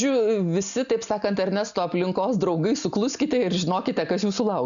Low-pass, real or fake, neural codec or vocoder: 7.2 kHz; real; none